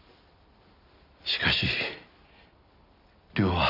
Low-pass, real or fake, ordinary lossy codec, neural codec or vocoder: 5.4 kHz; real; none; none